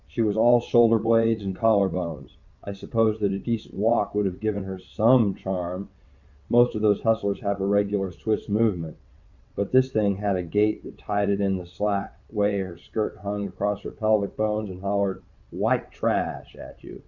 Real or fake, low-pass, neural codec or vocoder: fake; 7.2 kHz; vocoder, 22.05 kHz, 80 mel bands, WaveNeXt